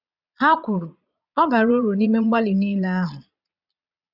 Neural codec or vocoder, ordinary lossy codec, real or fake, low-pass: vocoder, 22.05 kHz, 80 mel bands, Vocos; none; fake; 5.4 kHz